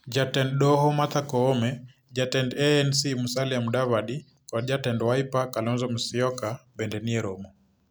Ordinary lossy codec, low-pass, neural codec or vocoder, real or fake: none; none; none; real